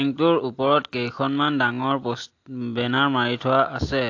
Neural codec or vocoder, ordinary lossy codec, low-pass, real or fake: none; AAC, 48 kbps; 7.2 kHz; real